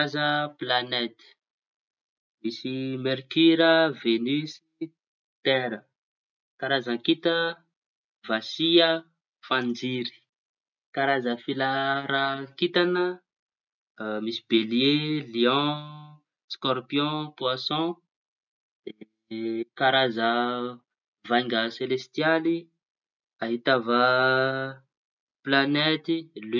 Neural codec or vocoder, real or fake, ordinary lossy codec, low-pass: none; real; none; 7.2 kHz